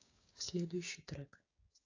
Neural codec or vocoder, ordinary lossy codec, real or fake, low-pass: codec, 16 kHz, 6 kbps, DAC; AAC, 32 kbps; fake; 7.2 kHz